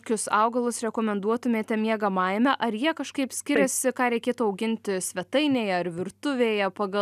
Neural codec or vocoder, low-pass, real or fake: none; 14.4 kHz; real